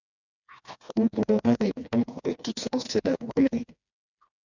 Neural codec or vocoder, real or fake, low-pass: codec, 16 kHz, 2 kbps, FreqCodec, smaller model; fake; 7.2 kHz